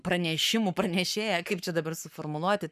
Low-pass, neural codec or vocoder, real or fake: 14.4 kHz; codec, 44.1 kHz, 7.8 kbps, Pupu-Codec; fake